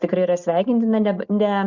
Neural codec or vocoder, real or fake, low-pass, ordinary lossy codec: none; real; 7.2 kHz; Opus, 64 kbps